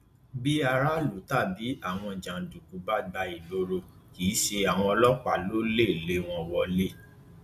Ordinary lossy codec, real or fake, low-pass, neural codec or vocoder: none; real; 14.4 kHz; none